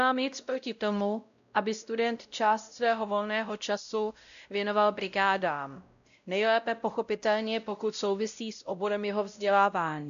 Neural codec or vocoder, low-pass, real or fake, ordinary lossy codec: codec, 16 kHz, 0.5 kbps, X-Codec, WavLM features, trained on Multilingual LibriSpeech; 7.2 kHz; fake; AAC, 96 kbps